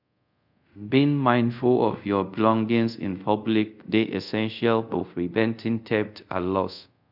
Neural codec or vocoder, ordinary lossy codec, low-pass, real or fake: codec, 24 kHz, 0.5 kbps, DualCodec; none; 5.4 kHz; fake